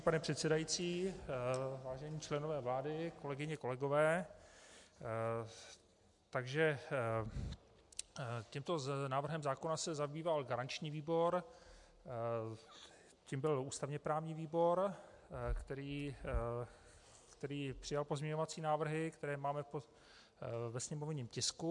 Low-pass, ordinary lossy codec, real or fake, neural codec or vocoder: 10.8 kHz; MP3, 64 kbps; real; none